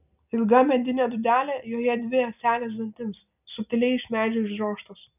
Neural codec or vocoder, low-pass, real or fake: none; 3.6 kHz; real